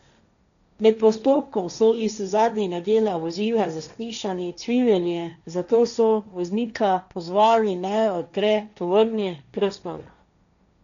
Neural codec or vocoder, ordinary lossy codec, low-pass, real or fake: codec, 16 kHz, 1.1 kbps, Voila-Tokenizer; none; 7.2 kHz; fake